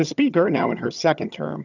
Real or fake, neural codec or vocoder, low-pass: fake; vocoder, 22.05 kHz, 80 mel bands, HiFi-GAN; 7.2 kHz